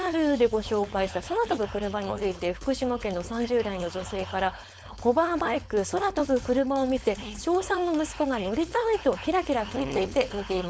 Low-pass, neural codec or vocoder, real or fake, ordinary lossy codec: none; codec, 16 kHz, 4.8 kbps, FACodec; fake; none